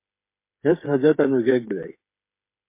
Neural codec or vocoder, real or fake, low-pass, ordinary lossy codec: codec, 16 kHz, 8 kbps, FreqCodec, smaller model; fake; 3.6 kHz; MP3, 24 kbps